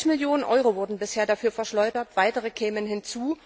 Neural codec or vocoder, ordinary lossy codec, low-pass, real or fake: none; none; none; real